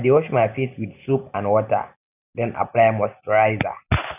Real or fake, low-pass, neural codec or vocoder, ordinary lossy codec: real; 3.6 kHz; none; none